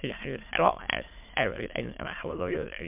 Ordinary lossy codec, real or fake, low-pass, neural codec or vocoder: MP3, 32 kbps; fake; 3.6 kHz; autoencoder, 22.05 kHz, a latent of 192 numbers a frame, VITS, trained on many speakers